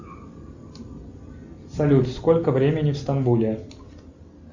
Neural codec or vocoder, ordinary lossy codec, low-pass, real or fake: none; Opus, 64 kbps; 7.2 kHz; real